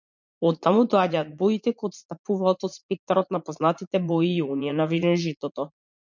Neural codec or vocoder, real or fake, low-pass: vocoder, 44.1 kHz, 80 mel bands, Vocos; fake; 7.2 kHz